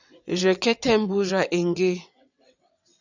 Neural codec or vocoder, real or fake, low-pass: vocoder, 22.05 kHz, 80 mel bands, WaveNeXt; fake; 7.2 kHz